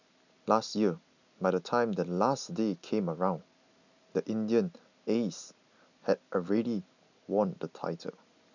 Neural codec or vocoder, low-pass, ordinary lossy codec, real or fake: none; 7.2 kHz; none; real